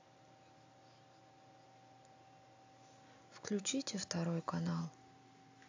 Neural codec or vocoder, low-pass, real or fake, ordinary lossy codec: none; 7.2 kHz; real; AAC, 48 kbps